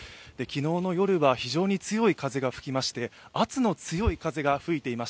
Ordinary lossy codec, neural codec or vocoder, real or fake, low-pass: none; none; real; none